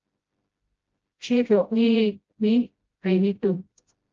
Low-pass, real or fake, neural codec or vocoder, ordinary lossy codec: 7.2 kHz; fake; codec, 16 kHz, 0.5 kbps, FreqCodec, smaller model; Opus, 24 kbps